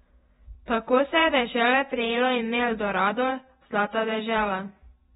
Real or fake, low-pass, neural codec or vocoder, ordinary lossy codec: fake; 19.8 kHz; vocoder, 48 kHz, 128 mel bands, Vocos; AAC, 16 kbps